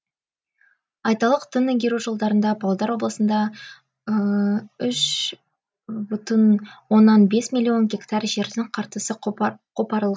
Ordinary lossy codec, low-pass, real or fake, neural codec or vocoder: none; none; real; none